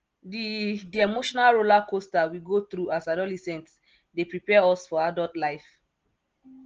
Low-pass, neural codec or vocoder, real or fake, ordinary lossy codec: 7.2 kHz; none; real; Opus, 16 kbps